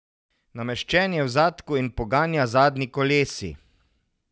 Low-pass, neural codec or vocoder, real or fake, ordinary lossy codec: none; none; real; none